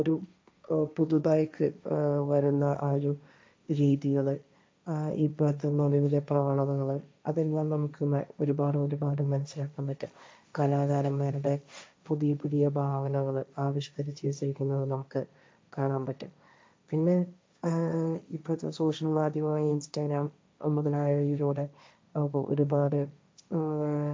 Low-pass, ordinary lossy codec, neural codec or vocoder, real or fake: none; none; codec, 16 kHz, 1.1 kbps, Voila-Tokenizer; fake